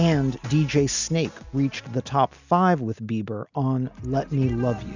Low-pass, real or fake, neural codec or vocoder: 7.2 kHz; real; none